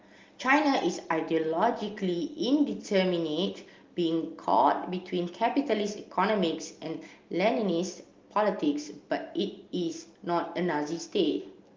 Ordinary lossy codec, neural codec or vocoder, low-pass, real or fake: Opus, 32 kbps; none; 7.2 kHz; real